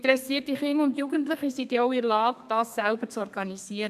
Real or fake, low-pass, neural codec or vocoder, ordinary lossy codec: fake; 14.4 kHz; codec, 32 kHz, 1.9 kbps, SNAC; MP3, 96 kbps